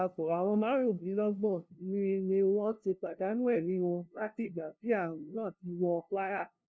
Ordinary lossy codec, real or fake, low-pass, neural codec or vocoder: none; fake; none; codec, 16 kHz, 0.5 kbps, FunCodec, trained on LibriTTS, 25 frames a second